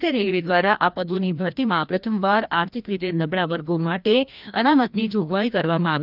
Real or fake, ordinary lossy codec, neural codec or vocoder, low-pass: fake; none; codec, 16 kHz, 1 kbps, FreqCodec, larger model; 5.4 kHz